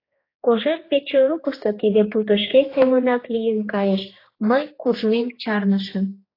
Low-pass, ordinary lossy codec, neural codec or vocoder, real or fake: 5.4 kHz; AAC, 24 kbps; codec, 16 kHz, 2 kbps, X-Codec, HuBERT features, trained on general audio; fake